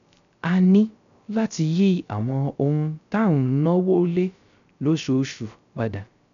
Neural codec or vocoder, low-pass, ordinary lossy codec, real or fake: codec, 16 kHz, 0.3 kbps, FocalCodec; 7.2 kHz; MP3, 96 kbps; fake